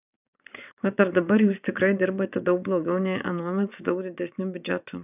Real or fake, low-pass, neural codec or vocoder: fake; 3.6 kHz; vocoder, 22.05 kHz, 80 mel bands, WaveNeXt